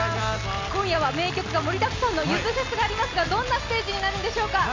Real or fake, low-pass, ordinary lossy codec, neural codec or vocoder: real; 7.2 kHz; MP3, 48 kbps; none